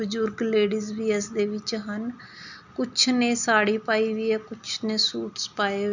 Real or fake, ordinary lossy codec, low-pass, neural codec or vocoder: real; none; 7.2 kHz; none